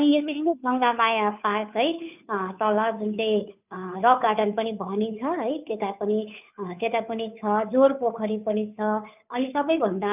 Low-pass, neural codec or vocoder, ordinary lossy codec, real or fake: 3.6 kHz; codec, 16 kHz, 2 kbps, FunCodec, trained on Chinese and English, 25 frames a second; none; fake